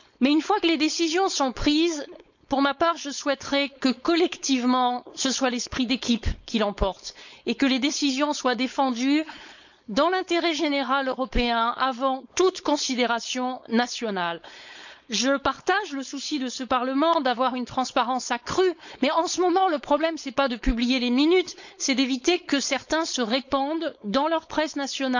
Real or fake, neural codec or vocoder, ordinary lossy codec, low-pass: fake; codec, 16 kHz, 4.8 kbps, FACodec; none; 7.2 kHz